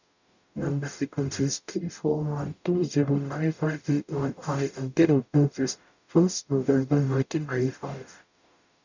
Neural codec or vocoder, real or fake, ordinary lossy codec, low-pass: codec, 44.1 kHz, 0.9 kbps, DAC; fake; none; 7.2 kHz